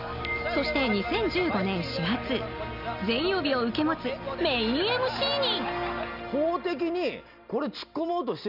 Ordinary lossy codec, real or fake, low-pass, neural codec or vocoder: none; real; 5.4 kHz; none